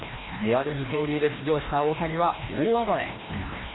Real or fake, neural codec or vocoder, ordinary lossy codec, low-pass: fake; codec, 16 kHz, 1 kbps, FreqCodec, larger model; AAC, 16 kbps; 7.2 kHz